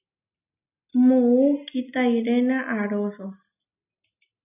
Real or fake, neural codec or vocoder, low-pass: real; none; 3.6 kHz